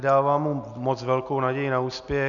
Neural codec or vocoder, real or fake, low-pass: none; real; 7.2 kHz